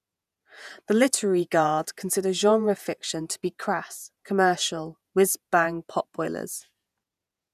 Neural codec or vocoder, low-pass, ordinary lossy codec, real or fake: vocoder, 48 kHz, 128 mel bands, Vocos; 14.4 kHz; none; fake